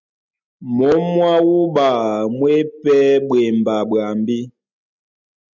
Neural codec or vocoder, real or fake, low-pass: none; real; 7.2 kHz